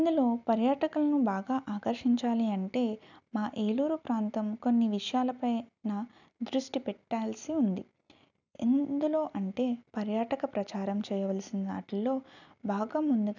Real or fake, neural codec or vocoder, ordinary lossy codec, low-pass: real; none; none; 7.2 kHz